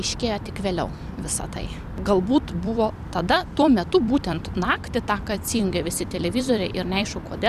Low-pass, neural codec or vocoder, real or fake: 14.4 kHz; vocoder, 44.1 kHz, 128 mel bands every 256 samples, BigVGAN v2; fake